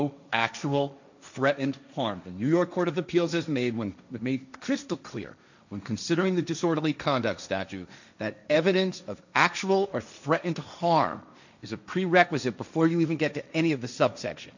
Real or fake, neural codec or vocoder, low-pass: fake; codec, 16 kHz, 1.1 kbps, Voila-Tokenizer; 7.2 kHz